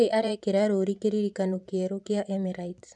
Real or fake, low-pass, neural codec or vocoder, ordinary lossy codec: fake; 10.8 kHz; vocoder, 44.1 kHz, 128 mel bands every 512 samples, BigVGAN v2; MP3, 96 kbps